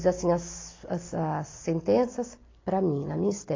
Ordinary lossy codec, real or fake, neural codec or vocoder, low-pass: AAC, 32 kbps; real; none; 7.2 kHz